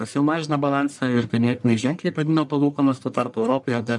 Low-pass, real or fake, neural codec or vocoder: 10.8 kHz; fake; codec, 44.1 kHz, 1.7 kbps, Pupu-Codec